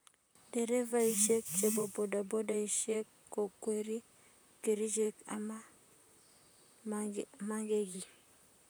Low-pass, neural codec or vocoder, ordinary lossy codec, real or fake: none; vocoder, 44.1 kHz, 128 mel bands, Pupu-Vocoder; none; fake